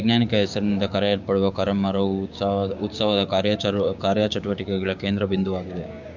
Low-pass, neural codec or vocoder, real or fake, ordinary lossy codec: 7.2 kHz; codec, 16 kHz, 6 kbps, DAC; fake; none